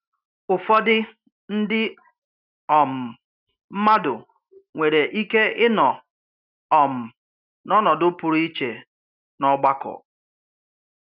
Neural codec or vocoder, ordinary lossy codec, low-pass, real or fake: none; none; 5.4 kHz; real